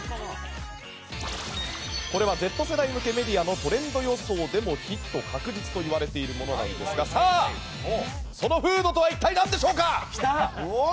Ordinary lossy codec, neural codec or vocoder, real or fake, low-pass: none; none; real; none